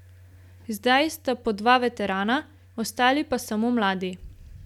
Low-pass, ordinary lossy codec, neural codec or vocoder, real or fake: 19.8 kHz; none; none; real